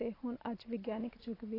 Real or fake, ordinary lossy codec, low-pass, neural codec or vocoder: real; AAC, 24 kbps; 5.4 kHz; none